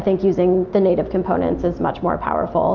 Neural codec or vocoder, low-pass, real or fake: none; 7.2 kHz; real